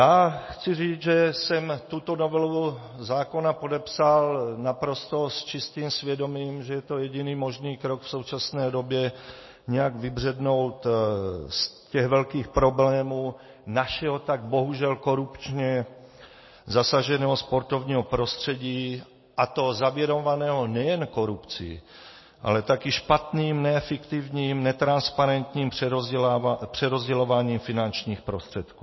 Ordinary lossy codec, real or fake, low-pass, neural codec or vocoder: MP3, 24 kbps; real; 7.2 kHz; none